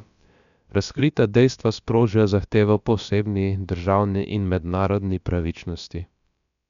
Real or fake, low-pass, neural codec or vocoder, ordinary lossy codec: fake; 7.2 kHz; codec, 16 kHz, about 1 kbps, DyCAST, with the encoder's durations; none